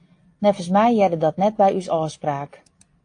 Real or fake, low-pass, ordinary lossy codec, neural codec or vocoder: real; 9.9 kHz; AAC, 48 kbps; none